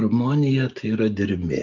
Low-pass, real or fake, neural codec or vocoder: 7.2 kHz; real; none